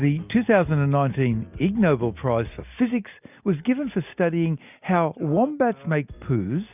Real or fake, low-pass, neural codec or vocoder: real; 3.6 kHz; none